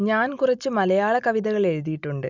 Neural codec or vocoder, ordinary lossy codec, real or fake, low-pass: none; none; real; 7.2 kHz